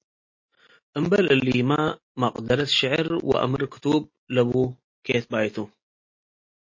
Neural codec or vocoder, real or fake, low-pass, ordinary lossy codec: none; real; 7.2 kHz; MP3, 32 kbps